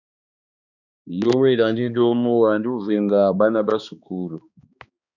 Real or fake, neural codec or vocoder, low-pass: fake; codec, 16 kHz, 2 kbps, X-Codec, HuBERT features, trained on balanced general audio; 7.2 kHz